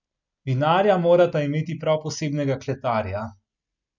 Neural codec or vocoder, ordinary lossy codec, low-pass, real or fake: none; none; 7.2 kHz; real